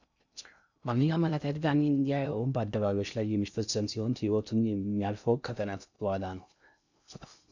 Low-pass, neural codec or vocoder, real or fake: 7.2 kHz; codec, 16 kHz in and 24 kHz out, 0.6 kbps, FocalCodec, streaming, 2048 codes; fake